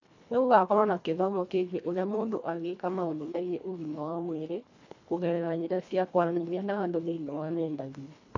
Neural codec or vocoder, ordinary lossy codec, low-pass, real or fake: codec, 24 kHz, 1.5 kbps, HILCodec; AAC, 48 kbps; 7.2 kHz; fake